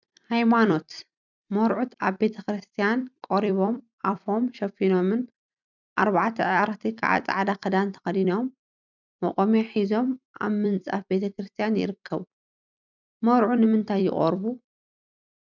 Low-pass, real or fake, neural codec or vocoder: 7.2 kHz; fake; vocoder, 44.1 kHz, 128 mel bands every 256 samples, BigVGAN v2